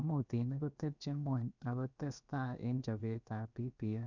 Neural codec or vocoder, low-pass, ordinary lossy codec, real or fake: codec, 16 kHz, about 1 kbps, DyCAST, with the encoder's durations; 7.2 kHz; none; fake